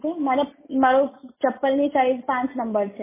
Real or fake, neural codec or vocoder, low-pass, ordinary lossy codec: real; none; 3.6 kHz; MP3, 16 kbps